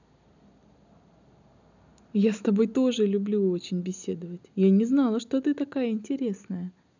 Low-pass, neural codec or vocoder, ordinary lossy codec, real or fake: 7.2 kHz; none; none; real